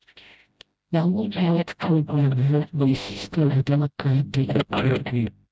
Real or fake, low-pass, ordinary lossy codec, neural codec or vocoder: fake; none; none; codec, 16 kHz, 0.5 kbps, FreqCodec, smaller model